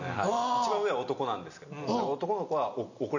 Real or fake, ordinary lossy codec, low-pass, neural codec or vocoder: real; none; 7.2 kHz; none